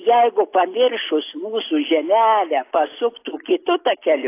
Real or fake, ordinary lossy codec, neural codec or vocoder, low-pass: real; AAC, 24 kbps; none; 3.6 kHz